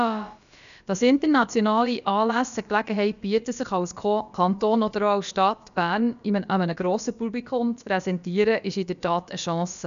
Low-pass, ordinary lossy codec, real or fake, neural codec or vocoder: 7.2 kHz; none; fake; codec, 16 kHz, about 1 kbps, DyCAST, with the encoder's durations